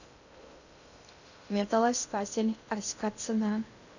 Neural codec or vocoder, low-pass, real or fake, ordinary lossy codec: codec, 16 kHz in and 24 kHz out, 0.6 kbps, FocalCodec, streaming, 2048 codes; 7.2 kHz; fake; none